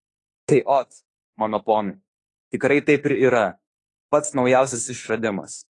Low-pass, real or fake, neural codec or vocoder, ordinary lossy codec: 10.8 kHz; fake; autoencoder, 48 kHz, 32 numbers a frame, DAC-VAE, trained on Japanese speech; AAC, 32 kbps